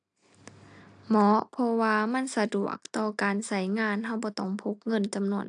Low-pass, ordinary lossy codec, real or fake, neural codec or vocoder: 9.9 kHz; AAC, 64 kbps; real; none